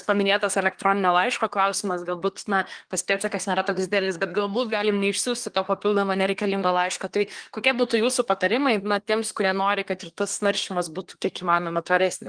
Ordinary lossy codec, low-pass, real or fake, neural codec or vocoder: Opus, 24 kbps; 9.9 kHz; fake; codec, 24 kHz, 1 kbps, SNAC